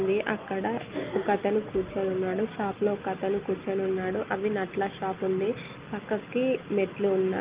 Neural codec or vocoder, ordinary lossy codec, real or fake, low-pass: none; Opus, 32 kbps; real; 3.6 kHz